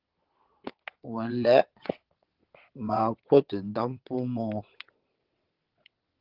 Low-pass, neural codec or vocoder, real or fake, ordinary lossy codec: 5.4 kHz; vocoder, 22.05 kHz, 80 mel bands, WaveNeXt; fake; Opus, 16 kbps